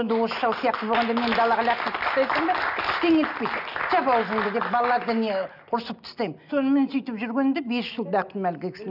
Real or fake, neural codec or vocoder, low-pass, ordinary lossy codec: real; none; 5.4 kHz; none